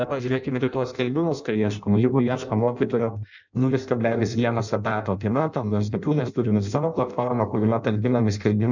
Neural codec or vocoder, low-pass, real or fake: codec, 16 kHz in and 24 kHz out, 0.6 kbps, FireRedTTS-2 codec; 7.2 kHz; fake